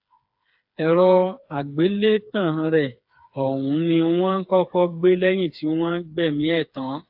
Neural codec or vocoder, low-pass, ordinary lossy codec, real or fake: codec, 16 kHz, 4 kbps, FreqCodec, smaller model; 5.4 kHz; AAC, 48 kbps; fake